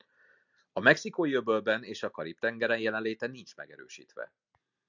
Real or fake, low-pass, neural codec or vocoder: real; 7.2 kHz; none